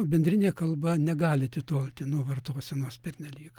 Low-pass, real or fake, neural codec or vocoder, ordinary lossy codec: 19.8 kHz; real; none; Opus, 32 kbps